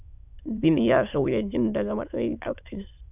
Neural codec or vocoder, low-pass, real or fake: autoencoder, 22.05 kHz, a latent of 192 numbers a frame, VITS, trained on many speakers; 3.6 kHz; fake